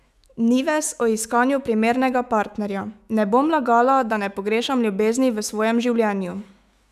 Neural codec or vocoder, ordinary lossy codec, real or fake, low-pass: autoencoder, 48 kHz, 128 numbers a frame, DAC-VAE, trained on Japanese speech; none; fake; 14.4 kHz